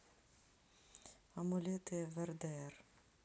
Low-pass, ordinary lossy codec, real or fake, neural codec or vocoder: none; none; real; none